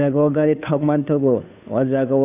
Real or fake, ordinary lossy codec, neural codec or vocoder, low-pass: fake; none; codec, 16 kHz, 2 kbps, FunCodec, trained on Chinese and English, 25 frames a second; 3.6 kHz